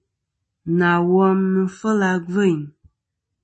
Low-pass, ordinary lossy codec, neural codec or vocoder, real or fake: 10.8 kHz; MP3, 32 kbps; none; real